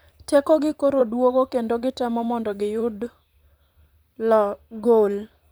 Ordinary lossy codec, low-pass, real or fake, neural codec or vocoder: none; none; fake; vocoder, 44.1 kHz, 128 mel bands, Pupu-Vocoder